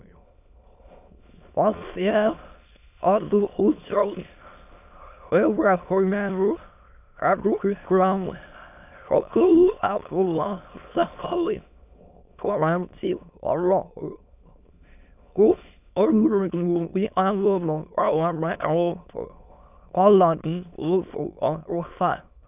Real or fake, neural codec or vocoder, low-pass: fake; autoencoder, 22.05 kHz, a latent of 192 numbers a frame, VITS, trained on many speakers; 3.6 kHz